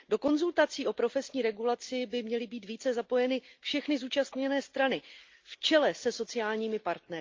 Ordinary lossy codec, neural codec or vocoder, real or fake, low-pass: Opus, 24 kbps; none; real; 7.2 kHz